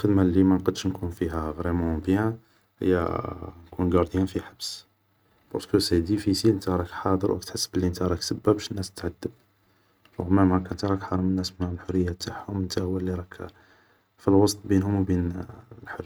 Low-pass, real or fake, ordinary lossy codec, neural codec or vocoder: none; real; none; none